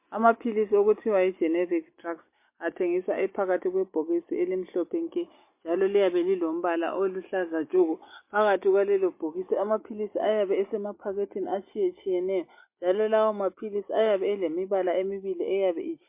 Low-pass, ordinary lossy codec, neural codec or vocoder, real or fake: 3.6 kHz; MP3, 24 kbps; none; real